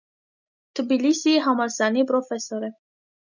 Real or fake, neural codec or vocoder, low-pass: real; none; 7.2 kHz